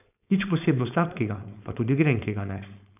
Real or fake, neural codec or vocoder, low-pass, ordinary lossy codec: fake; codec, 16 kHz, 4.8 kbps, FACodec; 3.6 kHz; none